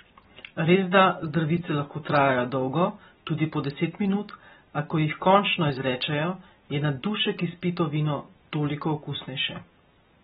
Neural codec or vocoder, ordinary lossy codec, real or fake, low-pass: none; AAC, 16 kbps; real; 19.8 kHz